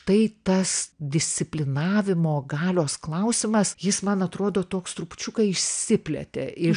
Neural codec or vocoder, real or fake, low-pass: none; real; 9.9 kHz